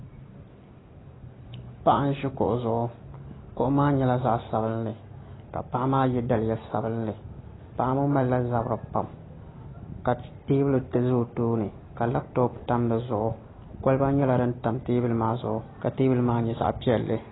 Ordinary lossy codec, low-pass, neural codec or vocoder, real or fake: AAC, 16 kbps; 7.2 kHz; none; real